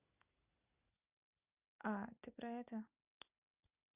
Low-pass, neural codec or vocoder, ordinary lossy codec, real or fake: 3.6 kHz; none; Opus, 64 kbps; real